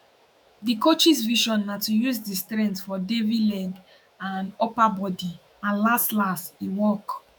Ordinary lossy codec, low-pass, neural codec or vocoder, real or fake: none; none; autoencoder, 48 kHz, 128 numbers a frame, DAC-VAE, trained on Japanese speech; fake